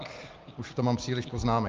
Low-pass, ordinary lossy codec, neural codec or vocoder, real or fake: 7.2 kHz; Opus, 32 kbps; none; real